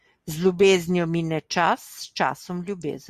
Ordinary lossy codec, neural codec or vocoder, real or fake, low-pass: Opus, 64 kbps; none; real; 14.4 kHz